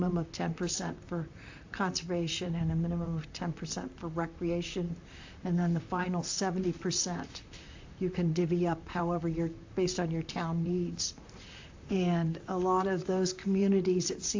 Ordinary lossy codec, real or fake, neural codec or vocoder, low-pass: AAC, 48 kbps; fake; vocoder, 44.1 kHz, 128 mel bands, Pupu-Vocoder; 7.2 kHz